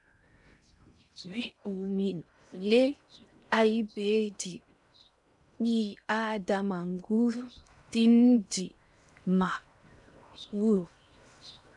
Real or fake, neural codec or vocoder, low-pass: fake; codec, 16 kHz in and 24 kHz out, 0.6 kbps, FocalCodec, streaming, 4096 codes; 10.8 kHz